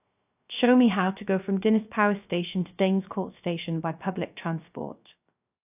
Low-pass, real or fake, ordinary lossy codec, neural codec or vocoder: 3.6 kHz; fake; AAC, 32 kbps; codec, 16 kHz, 0.3 kbps, FocalCodec